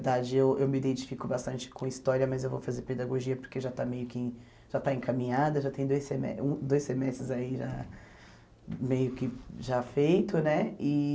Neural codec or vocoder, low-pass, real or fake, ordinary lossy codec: none; none; real; none